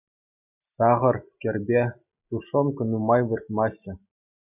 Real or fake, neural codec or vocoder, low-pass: real; none; 3.6 kHz